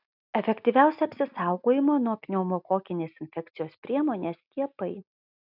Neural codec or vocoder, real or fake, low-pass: none; real; 5.4 kHz